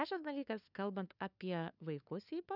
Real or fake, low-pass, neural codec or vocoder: fake; 5.4 kHz; codec, 16 kHz, 2 kbps, FunCodec, trained on LibriTTS, 25 frames a second